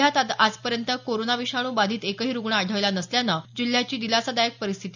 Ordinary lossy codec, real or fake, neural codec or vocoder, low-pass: none; real; none; 7.2 kHz